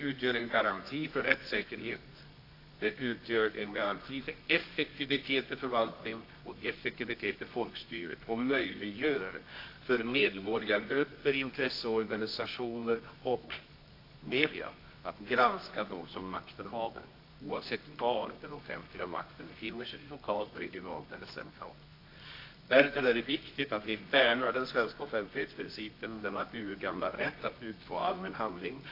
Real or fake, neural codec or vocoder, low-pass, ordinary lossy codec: fake; codec, 24 kHz, 0.9 kbps, WavTokenizer, medium music audio release; 5.4 kHz; AAC, 32 kbps